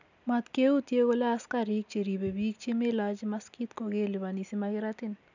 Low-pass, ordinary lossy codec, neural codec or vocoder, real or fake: 7.2 kHz; none; none; real